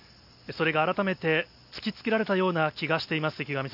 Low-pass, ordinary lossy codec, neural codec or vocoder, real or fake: 5.4 kHz; none; none; real